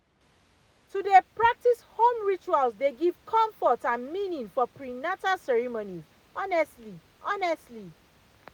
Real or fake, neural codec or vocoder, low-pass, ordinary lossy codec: real; none; none; none